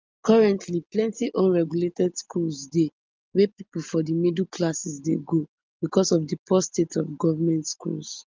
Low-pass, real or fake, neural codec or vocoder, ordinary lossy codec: 7.2 kHz; real; none; Opus, 32 kbps